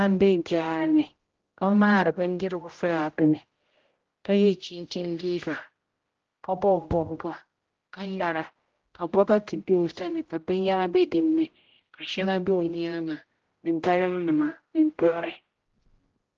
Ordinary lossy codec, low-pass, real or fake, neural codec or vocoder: Opus, 16 kbps; 7.2 kHz; fake; codec, 16 kHz, 0.5 kbps, X-Codec, HuBERT features, trained on general audio